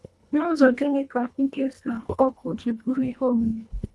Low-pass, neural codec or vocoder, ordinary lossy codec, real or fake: none; codec, 24 kHz, 1.5 kbps, HILCodec; none; fake